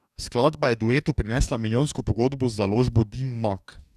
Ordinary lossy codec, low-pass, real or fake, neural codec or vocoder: none; 14.4 kHz; fake; codec, 44.1 kHz, 2.6 kbps, DAC